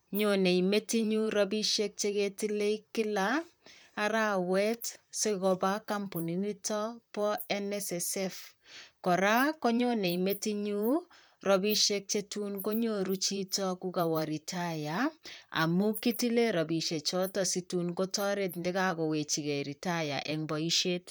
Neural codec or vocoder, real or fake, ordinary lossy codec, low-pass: vocoder, 44.1 kHz, 128 mel bands, Pupu-Vocoder; fake; none; none